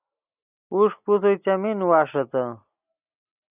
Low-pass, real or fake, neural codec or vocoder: 3.6 kHz; real; none